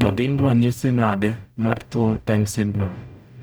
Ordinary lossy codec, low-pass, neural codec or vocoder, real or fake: none; none; codec, 44.1 kHz, 0.9 kbps, DAC; fake